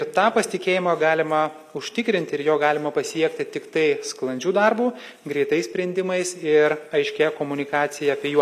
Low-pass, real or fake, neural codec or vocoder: 14.4 kHz; real; none